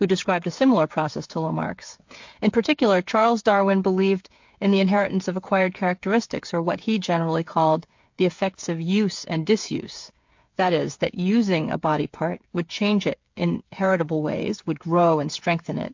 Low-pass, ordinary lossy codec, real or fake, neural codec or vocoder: 7.2 kHz; MP3, 48 kbps; fake; codec, 16 kHz, 8 kbps, FreqCodec, smaller model